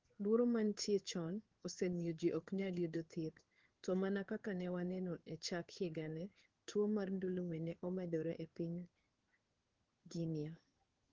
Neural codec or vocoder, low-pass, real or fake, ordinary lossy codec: codec, 16 kHz in and 24 kHz out, 1 kbps, XY-Tokenizer; 7.2 kHz; fake; Opus, 16 kbps